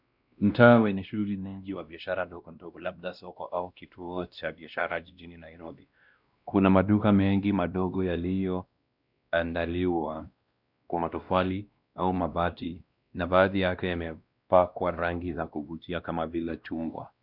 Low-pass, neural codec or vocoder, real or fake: 5.4 kHz; codec, 16 kHz, 1 kbps, X-Codec, WavLM features, trained on Multilingual LibriSpeech; fake